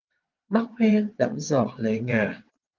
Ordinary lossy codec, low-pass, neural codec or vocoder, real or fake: Opus, 32 kbps; 7.2 kHz; vocoder, 22.05 kHz, 80 mel bands, WaveNeXt; fake